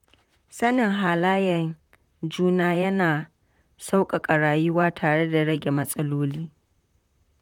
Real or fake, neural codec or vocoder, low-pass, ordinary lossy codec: fake; vocoder, 44.1 kHz, 128 mel bands, Pupu-Vocoder; 19.8 kHz; none